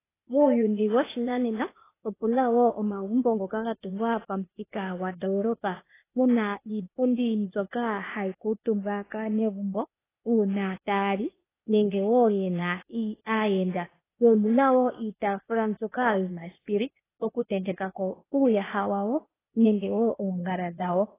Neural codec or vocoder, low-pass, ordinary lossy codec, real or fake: codec, 16 kHz, 0.8 kbps, ZipCodec; 3.6 kHz; AAC, 16 kbps; fake